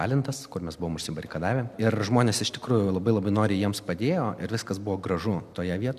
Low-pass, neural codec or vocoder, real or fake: 14.4 kHz; none; real